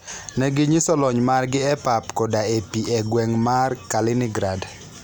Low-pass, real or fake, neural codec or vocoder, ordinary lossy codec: none; real; none; none